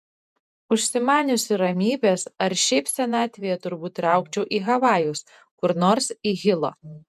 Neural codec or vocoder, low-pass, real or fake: vocoder, 48 kHz, 128 mel bands, Vocos; 14.4 kHz; fake